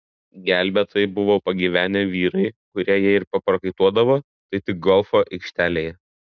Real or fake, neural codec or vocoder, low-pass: real; none; 7.2 kHz